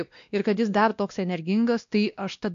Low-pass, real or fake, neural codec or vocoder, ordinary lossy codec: 7.2 kHz; fake; codec, 16 kHz, 1 kbps, X-Codec, WavLM features, trained on Multilingual LibriSpeech; AAC, 64 kbps